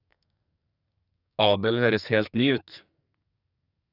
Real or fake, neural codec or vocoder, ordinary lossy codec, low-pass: fake; codec, 44.1 kHz, 2.6 kbps, SNAC; none; 5.4 kHz